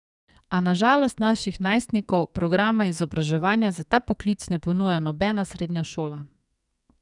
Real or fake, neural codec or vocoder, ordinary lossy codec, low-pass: fake; codec, 44.1 kHz, 2.6 kbps, SNAC; none; 10.8 kHz